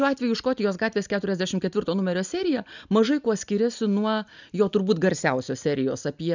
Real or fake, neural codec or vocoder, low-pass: real; none; 7.2 kHz